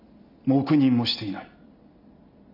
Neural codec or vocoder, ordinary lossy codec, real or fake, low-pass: none; none; real; 5.4 kHz